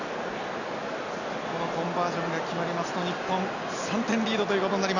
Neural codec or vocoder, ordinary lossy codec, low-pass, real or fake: none; none; 7.2 kHz; real